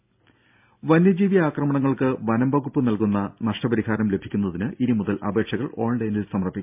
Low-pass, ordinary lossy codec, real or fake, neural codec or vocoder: 3.6 kHz; MP3, 32 kbps; real; none